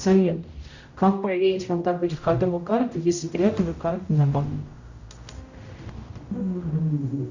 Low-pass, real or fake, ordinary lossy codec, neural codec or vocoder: 7.2 kHz; fake; Opus, 64 kbps; codec, 16 kHz, 0.5 kbps, X-Codec, HuBERT features, trained on general audio